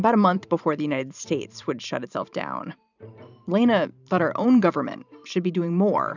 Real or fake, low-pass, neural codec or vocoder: fake; 7.2 kHz; vocoder, 44.1 kHz, 128 mel bands every 512 samples, BigVGAN v2